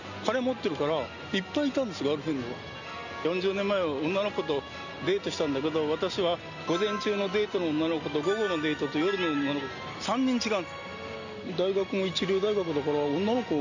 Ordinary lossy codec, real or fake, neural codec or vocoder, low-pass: none; real; none; 7.2 kHz